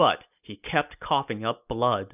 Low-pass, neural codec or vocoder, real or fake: 3.6 kHz; none; real